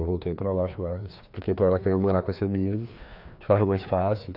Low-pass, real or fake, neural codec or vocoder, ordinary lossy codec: 5.4 kHz; fake; codec, 16 kHz, 2 kbps, FreqCodec, larger model; AAC, 48 kbps